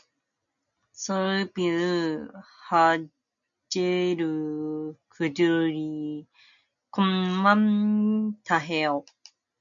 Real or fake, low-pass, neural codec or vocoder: real; 7.2 kHz; none